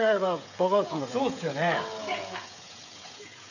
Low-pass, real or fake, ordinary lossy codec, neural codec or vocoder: 7.2 kHz; fake; none; codec, 16 kHz, 16 kbps, FreqCodec, smaller model